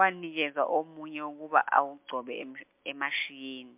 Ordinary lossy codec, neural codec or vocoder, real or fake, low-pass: MP3, 32 kbps; none; real; 3.6 kHz